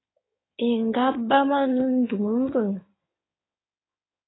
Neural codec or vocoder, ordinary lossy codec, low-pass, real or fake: codec, 16 kHz in and 24 kHz out, 2.2 kbps, FireRedTTS-2 codec; AAC, 16 kbps; 7.2 kHz; fake